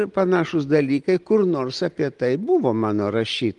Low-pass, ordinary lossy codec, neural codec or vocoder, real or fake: 10.8 kHz; Opus, 24 kbps; none; real